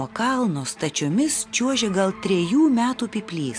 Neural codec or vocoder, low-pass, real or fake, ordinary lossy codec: none; 9.9 kHz; real; AAC, 64 kbps